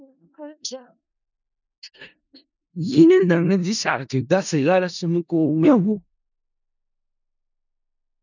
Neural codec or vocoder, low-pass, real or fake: codec, 16 kHz in and 24 kHz out, 0.4 kbps, LongCat-Audio-Codec, four codebook decoder; 7.2 kHz; fake